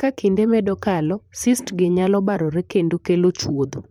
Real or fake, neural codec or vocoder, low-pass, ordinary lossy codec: fake; vocoder, 44.1 kHz, 128 mel bands, Pupu-Vocoder; 19.8 kHz; MP3, 96 kbps